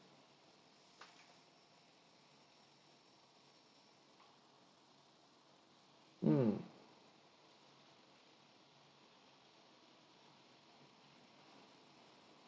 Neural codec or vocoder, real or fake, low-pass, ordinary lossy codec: none; real; none; none